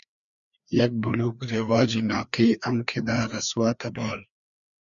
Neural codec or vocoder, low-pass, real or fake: codec, 16 kHz, 2 kbps, FreqCodec, larger model; 7.2 kHz; fake